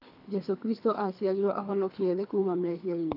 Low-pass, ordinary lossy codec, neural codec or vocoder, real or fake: 5.4 kHz; AAC, 48 kbps; codec, 24 kHz, 3 kbps, HILCodec; fake